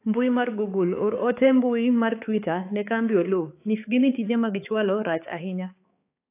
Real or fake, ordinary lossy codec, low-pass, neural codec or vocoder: fake; AAC, 24 kbps; 3.6 kHz; codec, 16 kHz, 4 kbps, X-Codec, HuBERT features, trained on balanced general audio